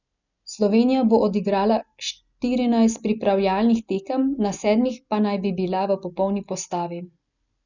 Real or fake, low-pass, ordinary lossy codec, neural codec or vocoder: real; 7.2 kHz; none; none